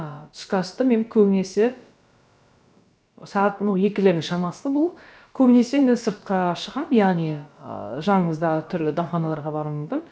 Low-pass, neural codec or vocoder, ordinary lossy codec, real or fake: none; codec, 16 kHz, about 1 kbps, DyCAST, with the encoder's durations; none; fake